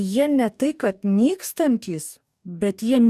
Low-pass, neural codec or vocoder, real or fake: 14.4 kHz; codec, 44.1 kHz, 2.6 kbps, DAC; fake